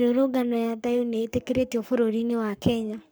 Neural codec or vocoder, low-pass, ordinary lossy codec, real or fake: codec, 44.1 kHz, 7.8 kbps, Pupu-Codec; none; none; fake